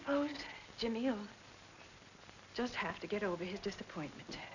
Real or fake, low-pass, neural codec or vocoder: real; 7.2 kHz; none